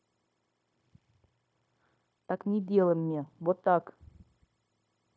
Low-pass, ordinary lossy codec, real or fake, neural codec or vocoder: none; none; fake; codec, 16 kHz, 0.9 kbps, LongCat-Audio-Codec